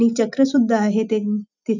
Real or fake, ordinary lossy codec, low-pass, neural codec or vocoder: real; none; 7.2 kHz; none